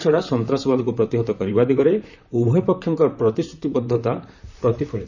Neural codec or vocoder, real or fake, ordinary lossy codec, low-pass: vocoder, 44.1 kHz, 128 mel bands, Pupu-Vocoder; fake; none; 7.2 kHz